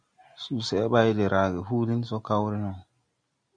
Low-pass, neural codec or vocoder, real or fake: 9.9 kHz; none; real